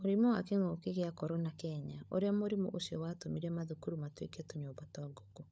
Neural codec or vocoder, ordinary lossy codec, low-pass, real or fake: codec, 16 kHz, 16 kbps, FunCodec, trained on LibriTTS, 50 frames a second; none; none; fake